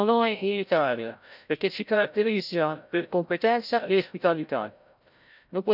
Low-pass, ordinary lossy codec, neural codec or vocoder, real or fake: 5.4 kHz; none; codec, 16 kHz, 0.5 kbps, FreqCodec, larger model; fake